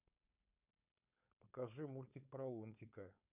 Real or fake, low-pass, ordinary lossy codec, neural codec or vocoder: fake; 3.6 kHz; none; codec, 16 kHz, 4.8 kbps, FACodec